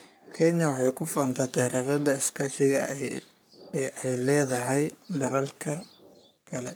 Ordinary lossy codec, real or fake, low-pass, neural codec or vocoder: none; fake; none; codec, 44.1 kHz, 3.4 kbps, Pupu-Codec